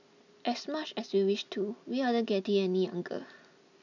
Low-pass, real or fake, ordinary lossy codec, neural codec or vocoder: 7.2 kHz; real; none; none